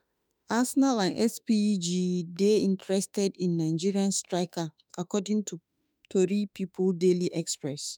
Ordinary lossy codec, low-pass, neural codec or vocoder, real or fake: none; none; autoencoder, 48 kHz, 32 numbers a frame, DAC-VAE, trained on Japanese speech; fake